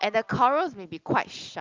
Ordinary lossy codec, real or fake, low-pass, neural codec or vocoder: Opus, 24 kbps; real; 7.2 kHz; none